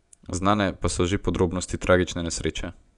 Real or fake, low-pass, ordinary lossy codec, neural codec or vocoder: fake; 10.8 kHz; none; vocoder, 24 kHz, 100 mel bands, Vocos